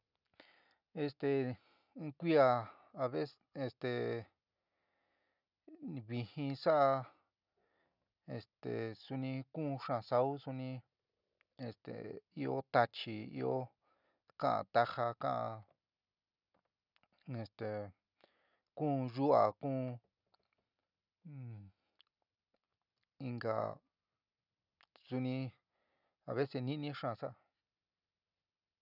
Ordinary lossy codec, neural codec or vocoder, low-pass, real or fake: none; none; 5.4 kHz; real